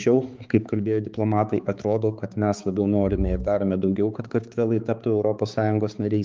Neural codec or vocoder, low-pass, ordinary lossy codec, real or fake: codec, 16 kHz, 4 kbps, X-Codec, HuBERT features, trained on balanced general audio; 7.2 kHz; Opus, 24 kbps; fake